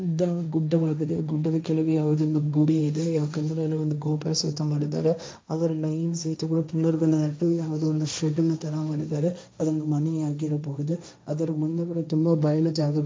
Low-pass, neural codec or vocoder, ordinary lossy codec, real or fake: none; codec, 16 kHz, 1.1 kbps, Voila-Tokenizer; none; fake